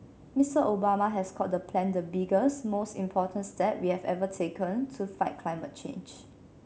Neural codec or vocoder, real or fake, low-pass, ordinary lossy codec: none; real; none; none